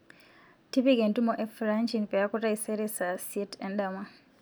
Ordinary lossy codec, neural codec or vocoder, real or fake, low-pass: none; none; real; none